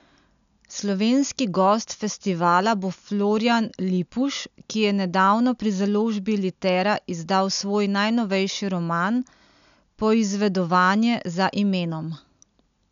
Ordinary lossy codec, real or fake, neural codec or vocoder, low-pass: none; real; none; 7.2 kHz